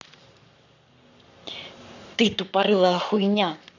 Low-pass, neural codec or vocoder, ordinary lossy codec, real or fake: 7.2 kHz; codec, 44.1 kHz, 7.8 kbps, Pupu-Codec; none; fake